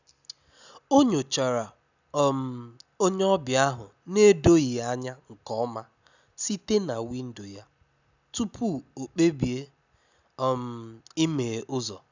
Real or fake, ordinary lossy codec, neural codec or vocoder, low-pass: real; none; none; 7.2 kHz